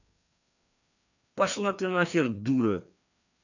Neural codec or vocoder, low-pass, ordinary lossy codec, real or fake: codec, 16 kHz, 2 kbps, FreqCodec, larger model; 7.2 kHz; none; fake